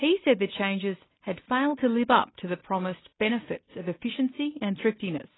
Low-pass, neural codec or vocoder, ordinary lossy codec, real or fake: 7.2 kHz; none; AAC, 16 kbps; real